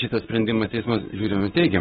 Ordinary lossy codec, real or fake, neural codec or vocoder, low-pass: AAC, 16 kbps; fake; codec, 44.1 kHz, 7.8 kbps, Pupu-Codec; 19.8 kHz